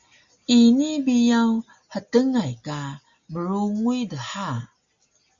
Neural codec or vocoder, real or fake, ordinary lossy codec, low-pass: none; real; Opus, 64 kbps; 7.2 kHz